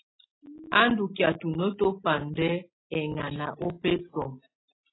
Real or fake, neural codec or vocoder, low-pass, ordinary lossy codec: real; none; 7.2 kHz; AAC, 16 kbps